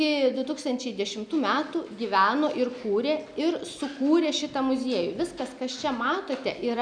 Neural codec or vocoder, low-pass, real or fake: none; 9.9 kHz; real